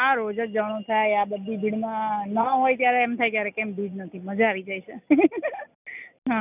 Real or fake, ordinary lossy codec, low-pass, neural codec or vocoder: real; none; 3.6 kHz; none